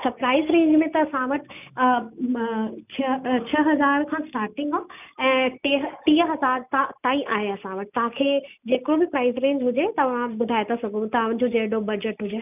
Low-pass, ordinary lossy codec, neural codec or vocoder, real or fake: 3.6 kHz; none; none; real